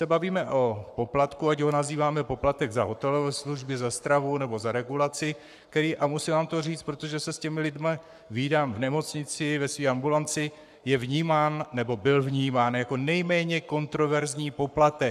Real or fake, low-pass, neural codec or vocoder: fake; 14.4 kHz; codec, 44.1 kHz, 7.8 kbps, Pupu-Codec